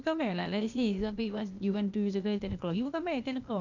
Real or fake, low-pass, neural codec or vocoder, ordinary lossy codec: fake; 7.2 kHz; codec, 16 kHz, 0.8 kbps, ZipCodec; none